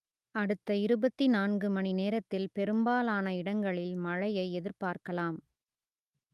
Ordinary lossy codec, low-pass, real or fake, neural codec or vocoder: Opus, 32 kbps; 14.4 kHz; fake; autoencoder, 48 kHz, 128 numbers a frame, DAC-VAE, trained on Japanese speech